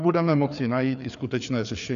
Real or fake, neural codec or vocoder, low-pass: fake; codec, 16 kHz, 4 kbps, FreqCodec, larger model; 7.2 kHz